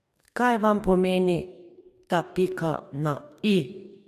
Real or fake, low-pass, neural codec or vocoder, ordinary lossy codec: fake; 14.4 kHz; codec, 44.1 kHz, 2.6 kbps, DAC; MP3, 96 kbps